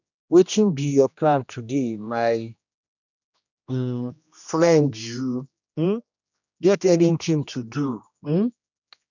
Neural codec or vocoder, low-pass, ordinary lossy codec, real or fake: codec, 16 kHz, 1 kbps, X-Codec, HuBERT features, trained on general audio; 7.2 kHz; none; fake